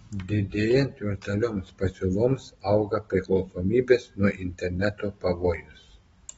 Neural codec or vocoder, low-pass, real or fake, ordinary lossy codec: none; 10.8 kHz; real; AAC, 24 kbps